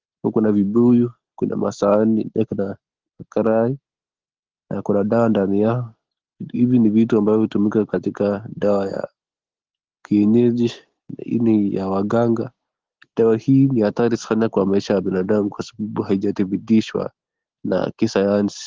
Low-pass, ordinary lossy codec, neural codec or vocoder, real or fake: 7.2 kHz; Opus, 16 kbps; none; real